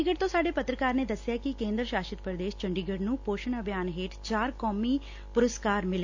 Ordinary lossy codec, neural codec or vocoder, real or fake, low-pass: AAC, 48 kbps; none; real; 7.2 kHz